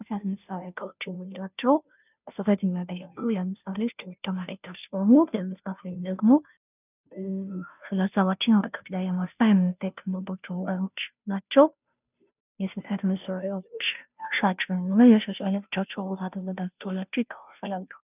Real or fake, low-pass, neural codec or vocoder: fake; 3.6 kHz; codec, 16 kHz, 0.5 kbps, FunCodec, trained on Chinese and English, 25 frames a second